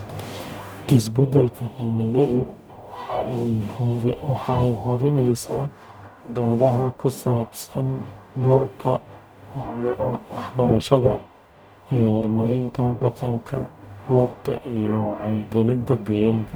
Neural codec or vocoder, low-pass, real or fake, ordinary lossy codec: codec, 44.1 kHz, 0.9 kbps, DAC; none; fake; none